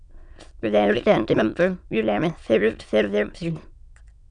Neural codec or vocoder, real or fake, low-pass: autoencoder, 22.05 kHz, a latent of 192 numbers a frame, VITS, trained on many speakers; fake; 9.9 kHz